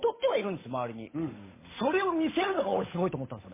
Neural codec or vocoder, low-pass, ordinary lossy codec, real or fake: codec, 16 kHz, 8 kbps, FunCodec, trained on Chinese and English, 25 frames a second; 3.6 kHz; MP3, 16 kbps; fake